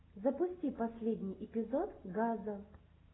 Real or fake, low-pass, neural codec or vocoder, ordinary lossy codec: real; 7.2 kHz; none; AAC, 16 kbps